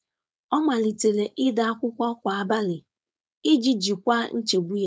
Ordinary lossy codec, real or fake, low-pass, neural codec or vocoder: none; fake; none; codec, 16 kHz, 4.8 kbps, FACodec